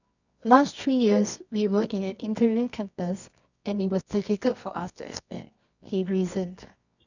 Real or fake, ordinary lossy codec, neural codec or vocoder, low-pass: fake; none; codec, 24 kHz, 0.9 kbps, WavTokenizer, medium music audio release; 7.2 kHz